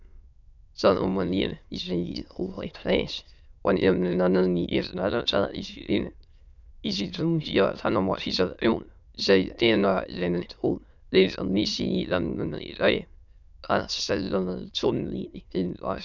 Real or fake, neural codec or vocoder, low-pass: fake; autoencoder, 22.05 kHz, a latent of 192 numbers a frame, VITS, trained on many speakers; 7.2 kHz